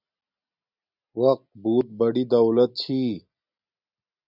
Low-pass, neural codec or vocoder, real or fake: 5.4 kHz; none; real